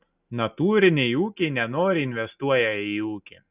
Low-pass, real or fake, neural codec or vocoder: 3.6 kHz; real; none